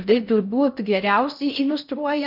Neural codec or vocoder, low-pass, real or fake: codec, 16 kHz in and 24 kHz out, 0.6 kbps, FocalCodec, streaming, 4096 codes; 5.4 kHz; fake